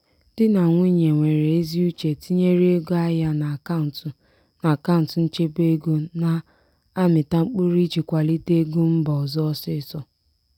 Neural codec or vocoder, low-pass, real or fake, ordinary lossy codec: none; 19.8 kHz; real; none